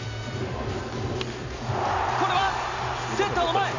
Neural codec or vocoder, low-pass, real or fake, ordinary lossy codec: none; 7.2 kHz; real; AAC, 48 kbps